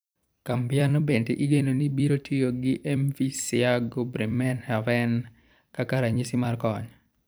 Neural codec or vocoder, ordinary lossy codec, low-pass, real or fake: vocoder, 44.1 kHz, 128 mel bands every 256 samples, BigVGAN v2; none; none; fake